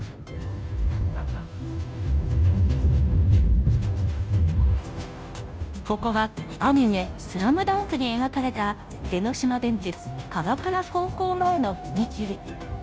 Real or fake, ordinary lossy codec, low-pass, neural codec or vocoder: fake; none; none; codec, 16 kHz, 0.5 kbps, FunCodec, trained on Chinese and English, 25 frames a second